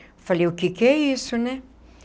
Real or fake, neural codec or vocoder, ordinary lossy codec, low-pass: real; none; none; none